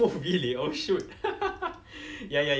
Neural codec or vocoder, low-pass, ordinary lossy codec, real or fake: none; none; none; real